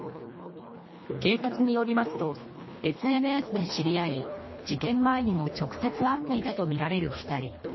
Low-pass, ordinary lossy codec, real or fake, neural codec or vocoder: 7.2 kHz; MP3, 24 kbps; fake; codec, 24 kHz, 1.5 kbps, HILCodec